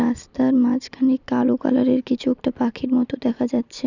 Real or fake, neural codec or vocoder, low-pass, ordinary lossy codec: fake; vocoder, 44.1 kHz, 128 mel bands every 256 samples, BigVGAN v2; 7.2 kHz; none